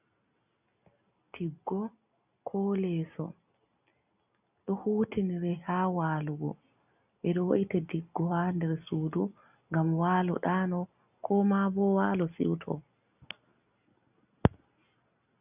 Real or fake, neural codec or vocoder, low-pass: real; none; 3.6 kHz